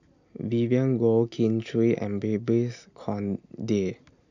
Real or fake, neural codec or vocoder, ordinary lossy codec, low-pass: real; none; none; 7.2 kHz